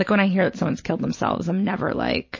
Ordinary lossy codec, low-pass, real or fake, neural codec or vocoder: MP3, 32 kbps; 7.2 kHz; real; none